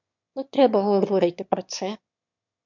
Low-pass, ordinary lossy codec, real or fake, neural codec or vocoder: 7.2 kHz; MP3, 64 kbps; fake; autoencoder, 22.05 kHz, a latent of 192 numbers a frame, VITS, trained on one speaker